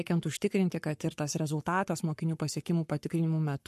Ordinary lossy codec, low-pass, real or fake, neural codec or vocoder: MP3, 64 kbps; 14.4 kHz; fake; codec, 44.1 kHz, 7.8 kbps, Pupu-Codec